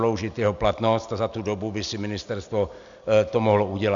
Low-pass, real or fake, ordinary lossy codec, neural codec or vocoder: 7.2 kHz; real; Opus, 64 kbps; none